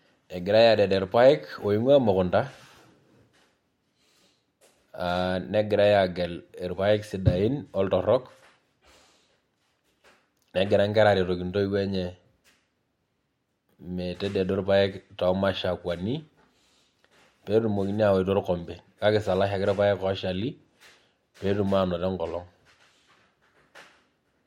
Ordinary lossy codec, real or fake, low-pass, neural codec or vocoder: MP3, 64 kbps; real; 19.8 kHz; none